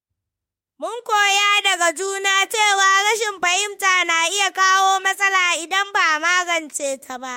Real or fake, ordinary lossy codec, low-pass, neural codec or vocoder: fake; MP3, 64 kbps; 19.8 kHz; autoencoder, 48 kHz, 32 numbers a frame, DAC-VAE, trained on Japanese speech